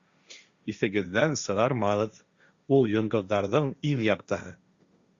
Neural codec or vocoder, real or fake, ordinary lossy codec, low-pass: codec, 16 kHz, 1.1 kbps, Voila-Tokenizer; fake; Opus, 64 kbps; 7.2 kHz